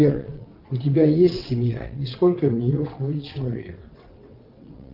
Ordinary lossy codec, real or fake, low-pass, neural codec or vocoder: Opus, 32 kbps; fake; 5.4 kHz; vocoder, 22.05 kHz, 80 mel bands, WaveNeXt